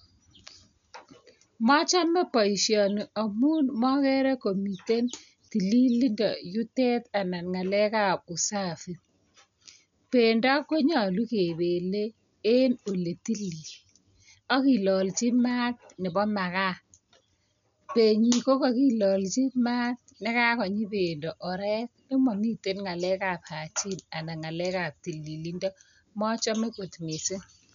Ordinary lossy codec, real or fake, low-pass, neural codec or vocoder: none; real; 7.2 kHz; none